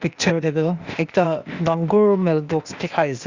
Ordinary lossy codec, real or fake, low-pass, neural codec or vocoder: Opus, 64 kbps; fake; 7.2 kHz; codec, 16 kHz, 0.8 kbps, ZipCodec